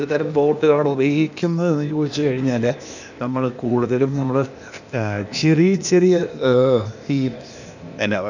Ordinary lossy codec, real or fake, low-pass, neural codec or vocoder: none; fake; 7.2 kHz; codec, 16 kHz, 0.8 kbps, ZipCodec